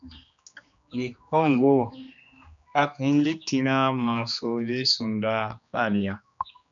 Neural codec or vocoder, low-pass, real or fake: codec, 16 kHz, 2 kbps, X-Codec, HuBERT features, trained on general audio; 7.2 kHz; fake